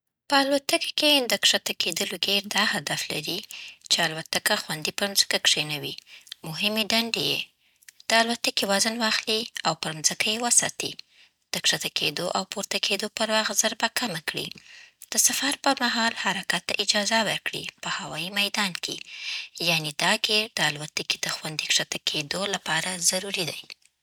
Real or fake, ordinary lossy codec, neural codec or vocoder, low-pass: real; none; none; none